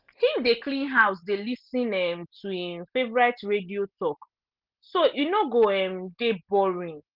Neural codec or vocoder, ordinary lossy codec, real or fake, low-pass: none; Opus, 32 kbps; real; 5.4 kHz